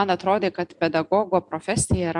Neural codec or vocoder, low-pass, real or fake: none; 10.8 kHz; real